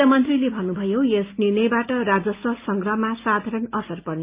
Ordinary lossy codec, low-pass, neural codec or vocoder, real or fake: Opus, 24 kbps; 3.6 kHz; none; real